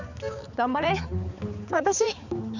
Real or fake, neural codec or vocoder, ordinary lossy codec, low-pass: fake; codec, 16 kHz, 4 kbps, X-Codec, HuBERT features, trained on balanced general audio; none; 7.2 kHz